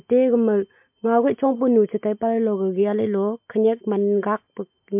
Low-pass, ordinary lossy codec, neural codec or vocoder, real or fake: 3.6 kHz; MP3, 32 kbps; none; real